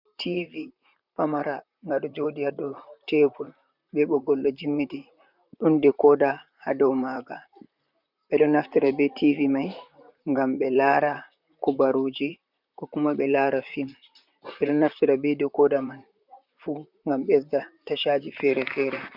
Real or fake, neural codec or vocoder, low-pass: fake; vocoder, 44.1 kHz, 128 mel bands, Pupu-Vocoder; 5.4 kHz